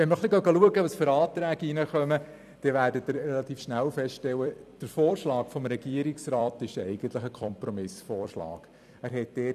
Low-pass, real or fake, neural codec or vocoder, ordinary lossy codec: 14.4 kHz; fake; vocoder, 44.1 kHz, 128 mel bands every 256 samples, BigVGAN v2; none